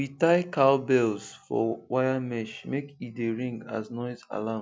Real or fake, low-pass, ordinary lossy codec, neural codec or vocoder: real; none; none; none